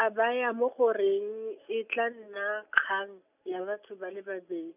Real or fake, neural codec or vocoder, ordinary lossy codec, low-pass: real; none; none; 3.6 kHz